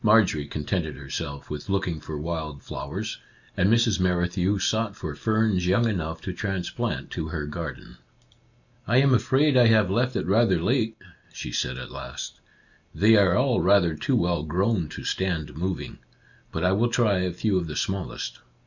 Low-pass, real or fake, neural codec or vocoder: 7.2 kHz; real; none